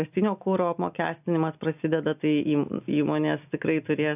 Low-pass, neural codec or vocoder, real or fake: 3.6 kHz; none; real